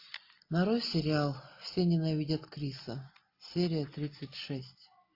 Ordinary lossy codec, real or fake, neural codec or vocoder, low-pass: AAC, 32 kbps; real; none; 5.4 kHz